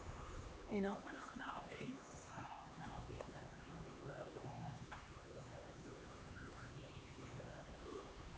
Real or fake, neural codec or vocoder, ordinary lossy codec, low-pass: fake; codec, 16 kHz, 2 kbps, X-Codec, HuBERT features, trained on LibriSpeech; none; none